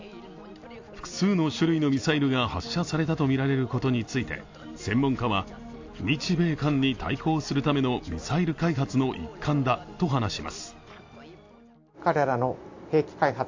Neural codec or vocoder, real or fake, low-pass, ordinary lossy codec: none; real; 7.2 kHz; AAC, 48 kbps